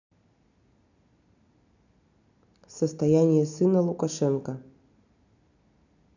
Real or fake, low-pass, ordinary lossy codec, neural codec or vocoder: real; 7.2 kHz; none; none